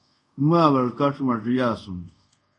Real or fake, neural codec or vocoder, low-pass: fake; codec, 24 kHz, 0.5 kbps, DualCodec; 10.8 kHz